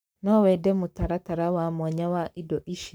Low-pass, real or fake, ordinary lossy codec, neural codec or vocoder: none; fake; none; codec, 44.1 kHz, 7.8 kbps, Pupu-Codec